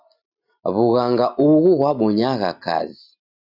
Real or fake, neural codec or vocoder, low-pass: real; none; 5.4 kHz